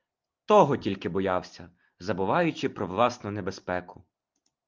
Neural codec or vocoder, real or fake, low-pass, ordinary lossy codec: none; real; 7.2 kHz; Opus, 32 kbps